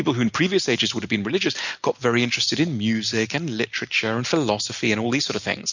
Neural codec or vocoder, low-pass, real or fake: none; 7.2 kHz; real